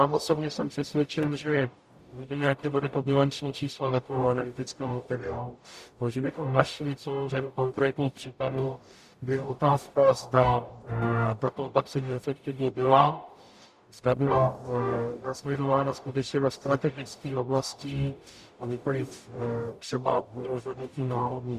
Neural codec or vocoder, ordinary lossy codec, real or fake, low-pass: codec, 44.1 kHz, 0.9 kbps, DAC; Opus, 64 kbps; fake; 14.4 kHz